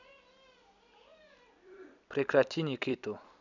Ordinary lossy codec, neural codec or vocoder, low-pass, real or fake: none; vocoder, 44.1 kHz, 128 mel bands every 256 samples, BigVGAN v2; 7.2 kHz; fake